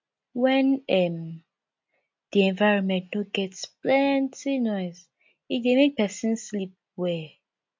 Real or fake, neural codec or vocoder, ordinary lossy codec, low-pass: real; none; MP3, 48 kbps; 7.2 kHz